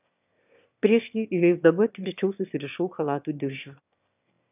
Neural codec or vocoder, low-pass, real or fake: autoencoder, 22.05 kHz, a latent of 192 numbers a frame, VITS, trained on one speaker; 3.6 kHz; fake